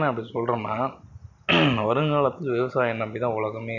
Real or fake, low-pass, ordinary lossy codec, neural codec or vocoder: real; 7.2 kHz; none; none